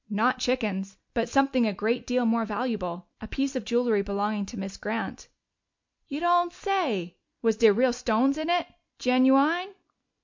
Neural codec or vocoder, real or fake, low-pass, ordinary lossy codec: none; real; 7.2 kHz; MP3, 64 kbps